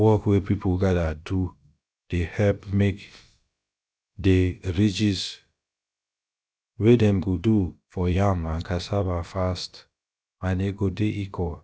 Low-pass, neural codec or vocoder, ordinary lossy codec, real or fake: none; codec, 16 kHz, about 1 kbps, DyCAST, with the encoder's durations; none; fake